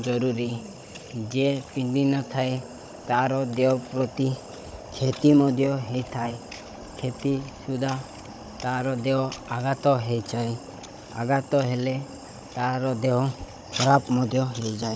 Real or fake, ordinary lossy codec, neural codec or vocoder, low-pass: fake; none; codec, 16 kHz, 16 kbps, FunCodec, trained on Chinese and English, 50 frames a second; none